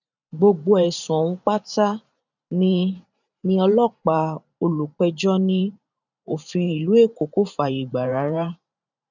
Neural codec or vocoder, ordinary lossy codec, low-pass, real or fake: vocoder, 44.1 kHz, 128 mel bands every 512 samples, BigVGAN v2; none; 7.2 kHz; fake